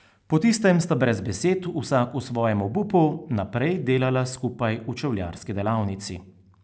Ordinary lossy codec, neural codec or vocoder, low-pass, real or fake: none; none; none; real